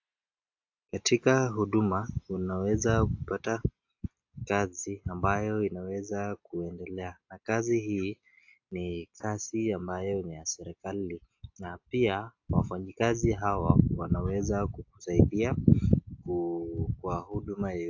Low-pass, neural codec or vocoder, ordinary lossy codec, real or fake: 7.2 kHz; none; AAC, 48 kbps; real